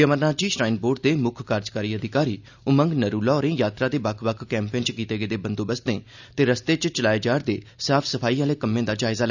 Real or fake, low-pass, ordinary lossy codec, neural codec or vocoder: real; 7.2 kHz; none; none